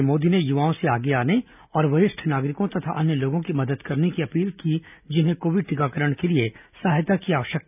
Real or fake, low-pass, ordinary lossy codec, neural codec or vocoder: real; 3.6 kHz; none; none